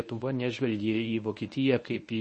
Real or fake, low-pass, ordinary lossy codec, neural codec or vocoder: fake; 10.8 kHz; MP3, 32 kbps; codec, 24 kHz, 0.9 kbps, WavTokenizer, medium speech release version 1